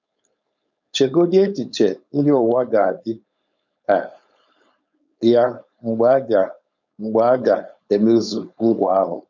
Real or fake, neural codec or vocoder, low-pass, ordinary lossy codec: fake; codec, 16 kHz, 4.8 kbps, FACodec; 7.2 kHz; none